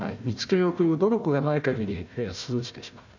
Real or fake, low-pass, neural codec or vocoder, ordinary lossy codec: fake; 7.2 kHz; codec, 16 kHz, 1 kbps, FunCodec, trained on Chinese and English, 50 frames a second; none